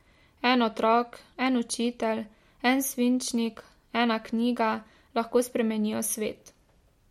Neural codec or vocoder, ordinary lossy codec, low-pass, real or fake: none; MP3, 64 kbps; 19.8 kHz; real